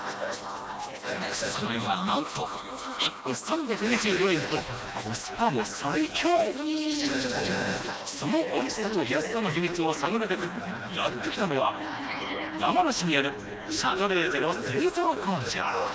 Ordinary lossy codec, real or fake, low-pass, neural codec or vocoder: none; fake; none; codec, 16 kHz, 1 kbps, FreqCodec, smaller model